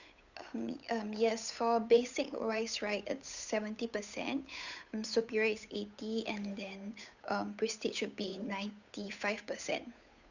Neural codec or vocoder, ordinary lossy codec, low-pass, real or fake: codec, 16 kHz, 8 kbps, FunCodec, trained on Chinese and English, 25 frames a second; none; 7.2 kHz; fake